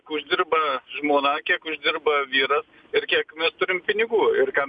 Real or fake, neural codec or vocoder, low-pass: real; none; 9.9 kHz